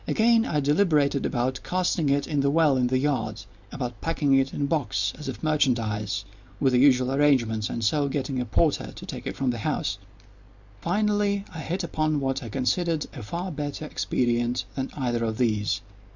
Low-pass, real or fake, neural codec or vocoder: 7.2 kHz; real; none